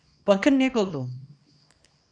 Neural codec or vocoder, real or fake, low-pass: codec, 24 kHz, 0.9 kbps, WavTokenizer, small release; fake; 9.9 kHz